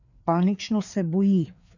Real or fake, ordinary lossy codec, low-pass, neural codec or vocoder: fake; none; 7.2 kHz; codec, 16 kHz, 4 kbps, FreqCodec, larger model